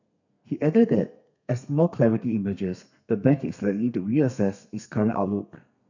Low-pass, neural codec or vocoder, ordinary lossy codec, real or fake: 7.2 kHz; codec, 32 kHz, 1.9 kbps, SNAC; none; fake